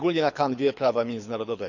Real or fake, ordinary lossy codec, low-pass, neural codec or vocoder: fake; none; 7.2 kHz; codec, 24 kHz, 6 kbps, HILCodec